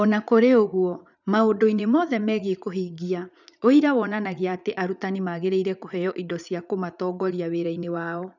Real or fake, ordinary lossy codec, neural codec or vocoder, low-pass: fake; none; vocoder, 44.1 kHz, 80 mel bands, Vocos; 7.2 kHz